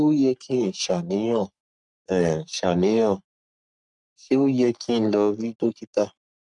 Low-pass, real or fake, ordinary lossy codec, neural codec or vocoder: 10.8 kHz; fake; none; codec, 44.1 kHz, 3.4 kbps, Pupu-Codec